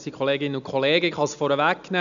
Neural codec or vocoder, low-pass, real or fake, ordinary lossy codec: none; 7.2 kHz; real; none